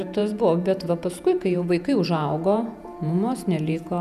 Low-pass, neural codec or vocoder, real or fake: 14.4 kHz; vocoder, 48 kHz, 128 mel bands, Vocos; fake